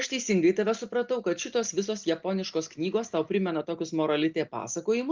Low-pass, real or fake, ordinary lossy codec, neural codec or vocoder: 7.2 kHz; real; Opus, 32 kbps; none